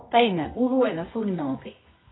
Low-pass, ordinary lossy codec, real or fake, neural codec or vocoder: 7.2 kHz; AAC, 16 kbps; fake; codec, 24 kHz, 0.9 kbps, WavTokenizer, medium music audio release